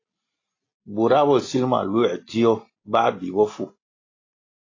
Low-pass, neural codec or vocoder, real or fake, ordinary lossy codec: 7.2 kHz; none; real; AAC, 32 kbps